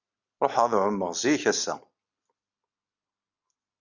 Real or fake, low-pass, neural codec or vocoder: real; 7.2 kHz; none